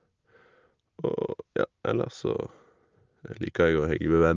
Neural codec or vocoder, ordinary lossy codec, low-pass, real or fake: none; Opus, 24 kbps; 7.2 kHz; real